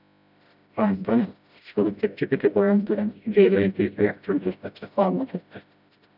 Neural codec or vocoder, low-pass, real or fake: codec, 16 kHz, 0.5 kbps, FreqCodec, smaller model; 5.4 kHz; fake